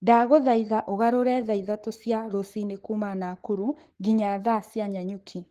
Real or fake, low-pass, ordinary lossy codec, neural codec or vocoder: fake; 14.4 kHz; Opus, 16 kbps; codec, 44.1 kHz, 7.8 kbps, Pupu-Codec